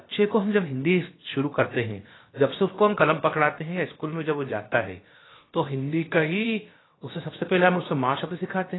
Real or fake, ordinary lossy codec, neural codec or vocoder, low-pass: fake; AAC, 16 kbps; codec, 16 kHz, about 1 kbps, DyCAST, with the encoder's durations; 7.2 kHz